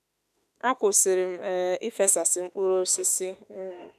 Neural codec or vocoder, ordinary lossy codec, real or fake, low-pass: autoencoder, 48 kHz, 32 numbers a frame, DAC-VAE, trained on Japanese speech; none; fake; 14.4 kHz